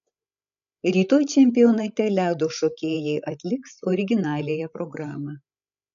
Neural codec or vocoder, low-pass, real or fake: codec, 16 kHz, 16 kbps, FreqCodec, larger model; 7.2 kHz; fake